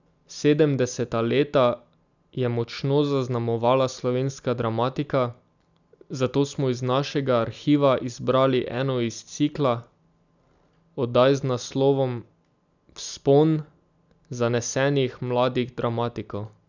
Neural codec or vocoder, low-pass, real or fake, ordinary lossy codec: none; 7.2 kHz; real; none